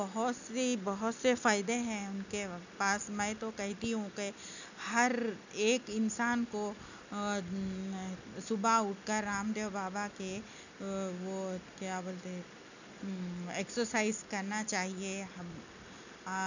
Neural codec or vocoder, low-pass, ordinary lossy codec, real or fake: none; 7.2 kHz; none; real